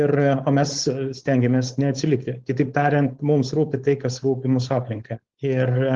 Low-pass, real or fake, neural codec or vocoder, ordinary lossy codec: 7.2 kHz; fake; codec, 16 kHz, 4.8 kbps, FACodec; Opus, 16 kbps